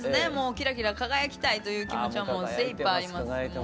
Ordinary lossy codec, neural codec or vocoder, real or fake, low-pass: none; none; real; none